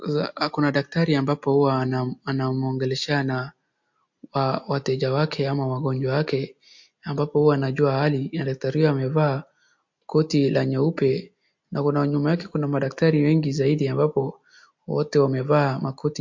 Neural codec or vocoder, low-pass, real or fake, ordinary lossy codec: none; 7.2 kHz; real; MP3, 48 kbps